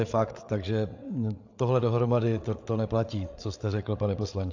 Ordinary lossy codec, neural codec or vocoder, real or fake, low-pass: AAC, 48 kbps; codec, 16 kHz, 8 kbps, FreqCodec, larger model; fake; 7.2 kHz